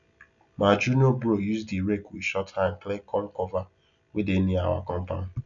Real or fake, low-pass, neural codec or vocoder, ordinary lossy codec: real; 7.2 kHz; none; none